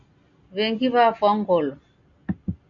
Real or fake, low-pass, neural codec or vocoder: real; 7.2 kHz; none